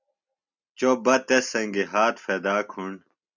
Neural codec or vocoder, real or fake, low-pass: none; real; 7.2 kHz